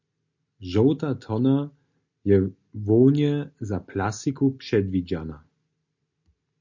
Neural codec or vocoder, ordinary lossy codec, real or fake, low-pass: none; MP3, 48 kbps; real; 7.2 kHz